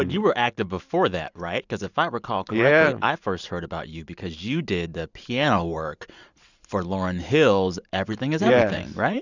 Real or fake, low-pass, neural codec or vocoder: fake; 7.2 kHz; vocoder, 44.1 kHz, 128 mel bands every 512 samples, BigVGAN v2